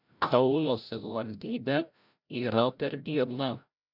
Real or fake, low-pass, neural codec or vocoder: fake; 5.4 kHz; codec, 16 kHz, 0.5 kbps, FreqCodec, larger model